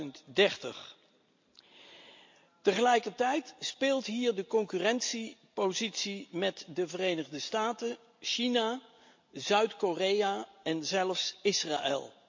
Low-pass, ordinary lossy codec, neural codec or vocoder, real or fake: 7.2 kHz; MP3, 48 kbps; none; real